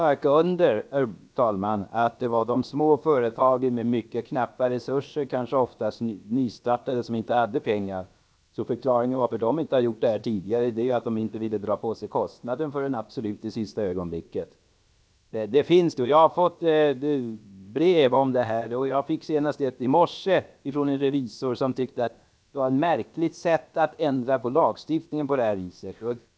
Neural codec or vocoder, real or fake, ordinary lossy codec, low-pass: codec, 16 kHz, about 1 kbps, DyCAST, with the encoder's durations; fake; none; none